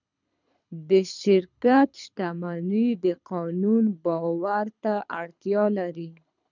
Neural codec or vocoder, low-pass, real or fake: codec, 24 kHz, 6 kbps, HILCodec; 7.2 kHz; fake